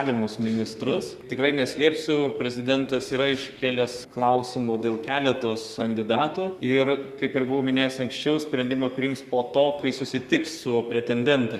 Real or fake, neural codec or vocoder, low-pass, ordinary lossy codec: fake; codec, 32 kHz, 1.9 kbps, SNAC; 14.4 kHz; Opus, 64 kbps